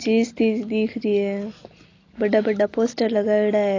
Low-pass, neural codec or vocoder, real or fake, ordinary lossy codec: 7.2 kHz; none; real; AAC, 32 kbps